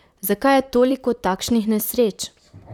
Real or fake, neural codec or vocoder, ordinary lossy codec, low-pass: fake; vocoder, 44.1 kHz, 128 mel bands, Pupu-Vocoder; none; 19.8 kHz